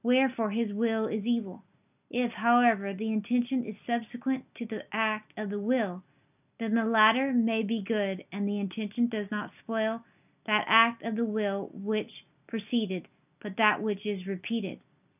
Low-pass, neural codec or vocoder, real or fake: 3.6 kHz; none; real